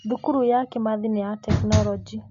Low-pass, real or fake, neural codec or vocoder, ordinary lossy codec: 7.2 kHz; real; none; MP3, 48 kbps